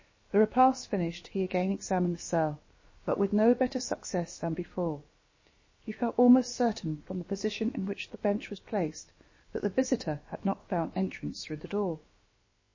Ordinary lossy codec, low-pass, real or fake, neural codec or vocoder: MP3, 32 kbps; 7.2 kHz; fake; codec, 16 kHz, about 1 kbps, DyCAST, with the encoder's durations